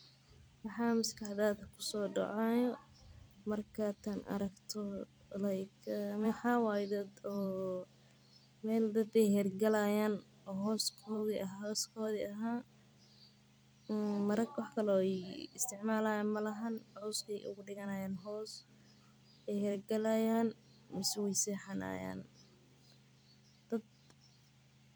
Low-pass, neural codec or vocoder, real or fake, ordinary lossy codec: none; vocoder, 44.1 kHz, 128 mel bands every 256 samples, BigVGAN v2; fake; none